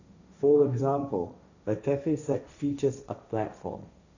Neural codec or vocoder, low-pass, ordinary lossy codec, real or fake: codec, 16 kHz, 1.1 kbps, Voila-Tokenizer; 7.2 kHz; none; fake